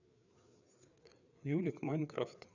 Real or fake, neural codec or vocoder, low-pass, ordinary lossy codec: fake; codec, 16 kHz, 4 kbps, FreqCodec, larger model; 7.2 kHz; none